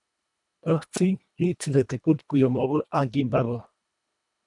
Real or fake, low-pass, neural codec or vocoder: fake; 10.8 kHz; codec, 24 kHz, 1.5 kbps, HILCodec